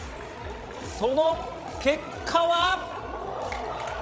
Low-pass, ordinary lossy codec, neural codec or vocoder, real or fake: none; none; codec, 16 kHz, 16 kbps, FreqCodec, larger model; fake